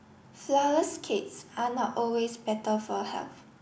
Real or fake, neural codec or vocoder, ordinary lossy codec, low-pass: real; none; none; none